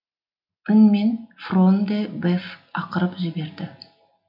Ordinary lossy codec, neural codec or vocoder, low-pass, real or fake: none; none; 5.4 kHz; real